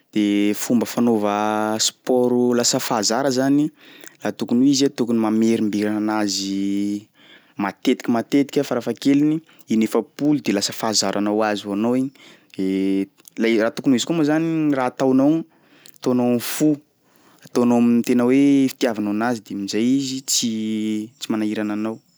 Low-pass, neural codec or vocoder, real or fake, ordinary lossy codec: none; none; real; none